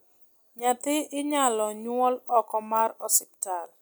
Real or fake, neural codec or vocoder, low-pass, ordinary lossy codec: real; none; none; none